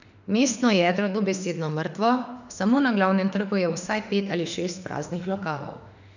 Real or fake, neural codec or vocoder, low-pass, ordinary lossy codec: fake; autoencoder, 48 kHz, 32 numbers a frame, DAC-VAE, trained on Japanese speech; 7.2 kHz; none